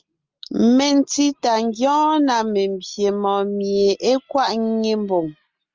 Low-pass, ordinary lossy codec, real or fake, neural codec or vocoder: 7.2 kHz; Opus, 32 kbps; real; none